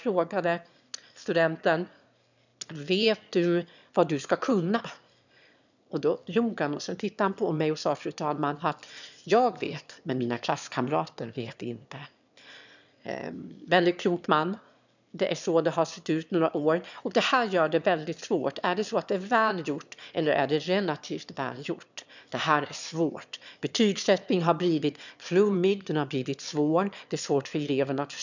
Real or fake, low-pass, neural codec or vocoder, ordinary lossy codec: fake; 7.2 kHz; autoencoder, 22.05 kHz, a latent of 192 numbers a frame, VITS, trained on one speaker; none